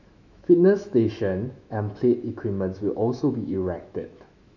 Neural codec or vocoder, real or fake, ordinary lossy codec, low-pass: autoencoder, 48 kHz, 128 numbers a frame, DAC-VAE, trained on Japanese speech; fake; none; 7.2 kHz